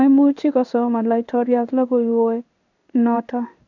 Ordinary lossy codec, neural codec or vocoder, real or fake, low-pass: none; codec, 16 kHz in and 24 kHz out, 1 kbps, XY-Tokenizer; fake; 7.2 kHz